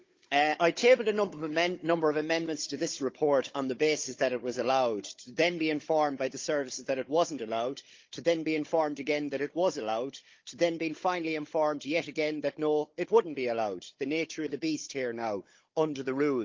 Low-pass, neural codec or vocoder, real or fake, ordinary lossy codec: 7.2 kHz; codec, 16 kHz, 16 kbps, FunCodec, trained on Chinese and English, 50 frames a second; fake; Opus, 32 kbps